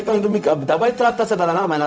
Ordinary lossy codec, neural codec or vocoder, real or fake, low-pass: none; codec, 16 kHz, 0.4 kbps, LongCat-Audio-Codec; fake; none